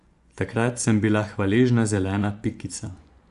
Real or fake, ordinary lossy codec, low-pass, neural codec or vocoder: fake; none; 10.8 kHz; vocoder, 24 kHz, 100 mel bands, Vocos